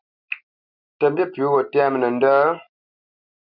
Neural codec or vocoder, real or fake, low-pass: none; real; 5.4 kHz